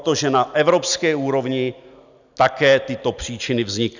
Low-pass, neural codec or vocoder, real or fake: 7.2 kHz; none; real